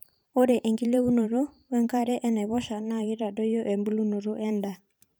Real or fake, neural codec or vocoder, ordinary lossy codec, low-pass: fake; vocoder, 44.1 kHz, 128 mel bands every 512 samples, BigVGAN v2; none; none